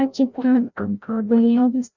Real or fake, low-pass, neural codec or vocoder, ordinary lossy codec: fake; 7.2 kHz; codec, 16 kHz, 0.5 kbps, FreqCodec, larger model; none